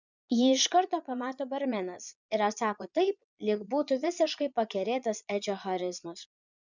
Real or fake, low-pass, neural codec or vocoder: fake; 7.2 kHz; vocoder, 22.05 kHz, 80 mel bands, Vocos